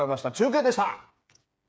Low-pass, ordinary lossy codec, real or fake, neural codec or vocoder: none; none; fake; codec, 16 kHz, 8 kbps, FreqCodec, smaller model